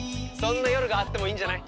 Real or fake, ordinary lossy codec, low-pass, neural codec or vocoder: real; none; none; none